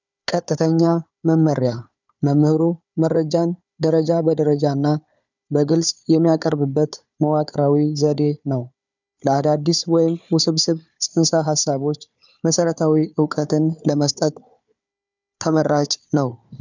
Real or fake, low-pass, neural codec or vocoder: fake; 7.2 kHz; codec, 16 kHz, 4 kbps, FunCodec, trained on Chinese and English, 50 frames a second